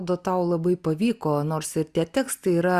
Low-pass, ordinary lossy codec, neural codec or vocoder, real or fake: 14.4 kHz; Opus, 64 kbps; none; real